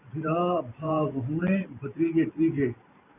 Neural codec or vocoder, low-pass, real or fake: vocoder, 44.1 kHz, 128 mel bands every 512 samples, BigVGAN v2; 3.6 kHz; fake